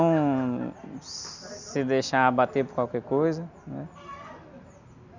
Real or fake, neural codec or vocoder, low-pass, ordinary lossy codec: real; none; 7.2 kHz; none